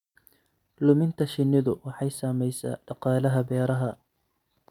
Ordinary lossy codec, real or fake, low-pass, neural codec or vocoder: none; real; 19.8 kHz; none